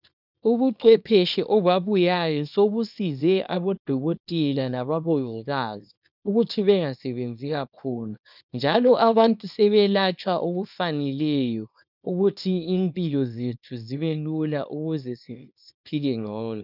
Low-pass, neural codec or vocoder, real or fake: 5.4 kHz; codec, 24 kHz, 0.9 kbps, WavTokenizer, small release; fake